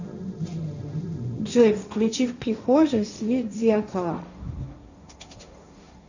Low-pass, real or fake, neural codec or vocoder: 7.2 kHz; fake; codec, 16 kHz, 1.1 kbps, Voila-Tokenizer